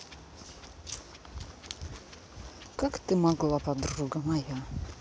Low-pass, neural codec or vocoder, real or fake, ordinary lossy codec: none; none; real; none